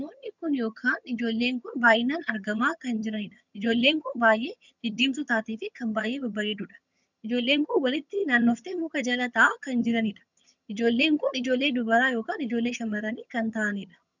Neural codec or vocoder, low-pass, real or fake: vocoder, 22.05 kHz, 80 mel bands, HiFi-GAN; 7.2 kHz; fake